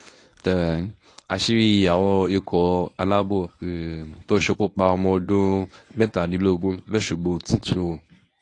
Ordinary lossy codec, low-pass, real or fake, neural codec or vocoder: AAC, 48 kbps; 10.8 kHz; fake; codec, 24 kHz, 0.9 kbps, WavTokenizer, medium speech release version 1